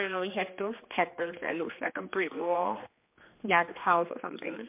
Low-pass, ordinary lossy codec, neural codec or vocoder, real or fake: 3.6 kHz; MP3, 32 kbps; codec, 16 kHz, 2 kbps, X-Codec, HuBERT features, trained on general audio; fake